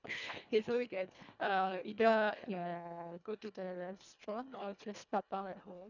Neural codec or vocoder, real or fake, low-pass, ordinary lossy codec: codec, 24 kHz, 1.5 kbps, HILCodec; fake; 7.2 kHz; none